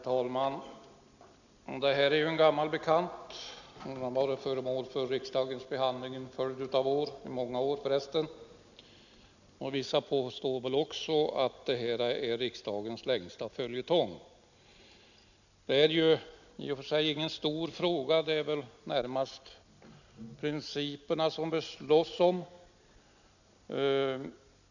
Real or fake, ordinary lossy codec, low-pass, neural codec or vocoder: real; none; 7.2 kHz; none